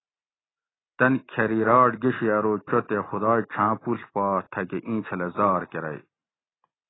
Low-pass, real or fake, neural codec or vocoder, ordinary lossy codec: 7.2 kHz; real; none; AAC, 16 kbps